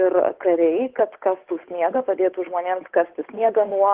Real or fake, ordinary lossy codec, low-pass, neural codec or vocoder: fake; Opus, 16 kbps; 3.6 kHz; codec, 16 kHz, 8 kbps, FunCodec, trained on Chinese and English, 25 frames a second